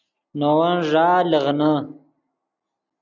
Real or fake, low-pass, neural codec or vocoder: real; 7.2 kHz; none